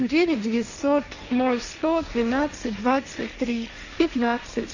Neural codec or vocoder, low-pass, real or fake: codec, 16 kHz, 1.1 kbps, Voila-Tokenizer; 7.2 kHz; fake